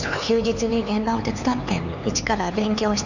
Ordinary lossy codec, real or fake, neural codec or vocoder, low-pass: none; fake; codec, 16 kHz, 4 kbps, X-Codec, HuBERT features, trained on LibriSpeech; 7.2 kHz